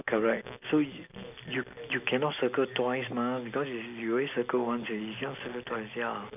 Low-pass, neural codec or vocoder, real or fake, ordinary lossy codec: 3.6 kHz; none; real; none